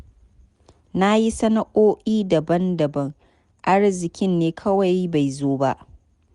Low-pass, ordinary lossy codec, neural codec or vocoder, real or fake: 10.8 kHz; Opus, 64 kbps; none; real